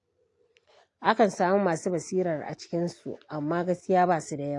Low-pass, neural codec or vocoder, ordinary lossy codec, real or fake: 10.8 kHz; none; AAC, 48 kbps; real